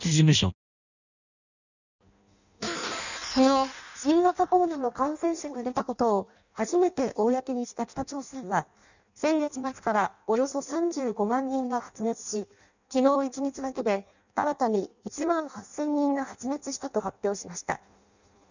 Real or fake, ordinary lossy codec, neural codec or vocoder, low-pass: fake; none; codec, 16 kHz in and 24 kHz out, 0.6 kbps, FireRedTTS-2 codec; 7.2 kHz